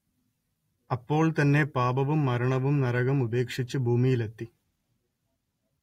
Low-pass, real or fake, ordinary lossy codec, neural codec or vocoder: 19.8 kHz; real; AAC, 48 kbps; none